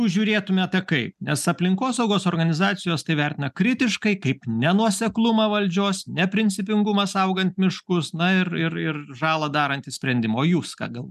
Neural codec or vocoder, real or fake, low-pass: none; real; 14.4 kHz